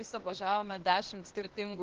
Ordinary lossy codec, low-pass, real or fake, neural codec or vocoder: Opus, 16 kbps; 7.2 kHz; fake; codec, 16 kHz, 0.8 kbps, ZipCodec